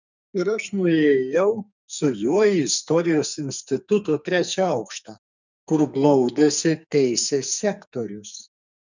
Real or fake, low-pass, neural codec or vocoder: fake; 7.2 kHz; codec, 44.1 kHz, 2.6 kbps, SNAC